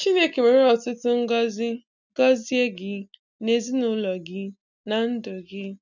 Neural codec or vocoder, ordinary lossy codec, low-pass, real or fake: none; none; 7.2 kHz; real